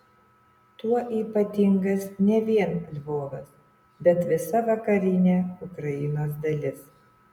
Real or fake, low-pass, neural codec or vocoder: real; 19.8 kHz; none